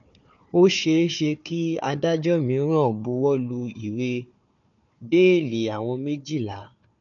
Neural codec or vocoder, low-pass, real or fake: codec, 16 kHz, 4 kbps, FunCodec, trained on Chinese and English, 50 frames a second; 7.2 kHz; fake